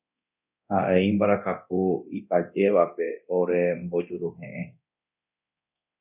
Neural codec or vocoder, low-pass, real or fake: codec, 24 kHz, 0.9 kbps, DualCodec; 3.6 kHz; fake